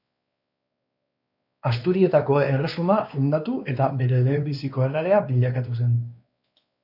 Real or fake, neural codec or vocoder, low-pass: fake; codec, 16 kHz, 2 kbps, X-Codec, WavLM features, trained on Multilingual LibriSpeech; 5.4 kHz